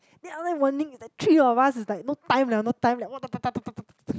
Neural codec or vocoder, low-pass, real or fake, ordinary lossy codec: none; none; real; none